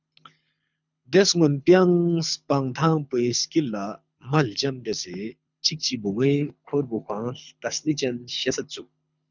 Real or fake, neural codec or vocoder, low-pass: fake; codec, 24 kHz, 6 kbps, HILCodec; 7.2 kHz